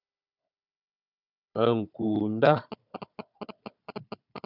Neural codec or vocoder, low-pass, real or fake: codec, 16 kHz, 4 kbps, FunCodec, trained on Chinese and English, 50 frames a second; 5.4 kHz; fake